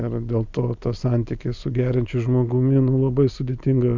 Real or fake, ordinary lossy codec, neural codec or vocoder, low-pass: real; MP3, 64 kbps; none; 7.2 kHz